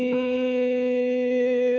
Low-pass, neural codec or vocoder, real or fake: 7.2 kHz; codec, 24 kHz, 6 kbps, HILCodec; fake